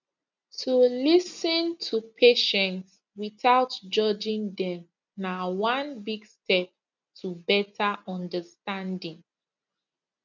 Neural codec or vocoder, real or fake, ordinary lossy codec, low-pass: none; real; none; 7.2 kHz